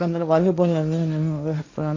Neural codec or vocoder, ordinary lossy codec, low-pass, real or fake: codec, 16 kHz, 1.1 kbps, Voila-Tokenizer; MP3, 64 kbps; 7.2 kHz; fake